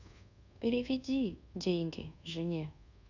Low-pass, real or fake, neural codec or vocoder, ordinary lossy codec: 7.2 kHz; fake; codec, 24 kHz, 1.2 kbps, DualCodec; none